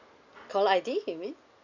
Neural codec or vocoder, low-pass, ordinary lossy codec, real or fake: none; 7.2 kHz; none; real